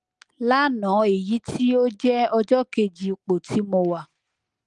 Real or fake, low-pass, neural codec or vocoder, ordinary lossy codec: real; 10.8 kHz; none; Opus, 24 kbps